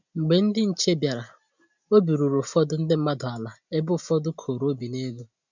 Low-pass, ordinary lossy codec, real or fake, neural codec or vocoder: 7.2 kHz; none; real; none